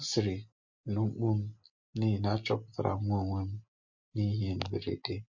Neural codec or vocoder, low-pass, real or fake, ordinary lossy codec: none; 7.2 kHz; real; MP3, 48 kbps